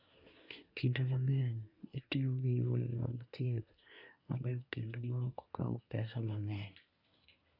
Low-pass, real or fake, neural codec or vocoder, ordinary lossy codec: 5.4 kHz; fake; codec, 24 kHz, 1 kbps, SNAC; none